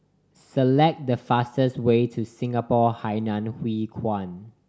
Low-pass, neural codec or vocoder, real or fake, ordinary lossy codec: none; none; real; none